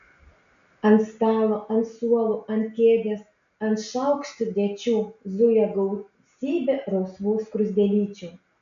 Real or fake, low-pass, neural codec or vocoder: real; 7.2 kHz; none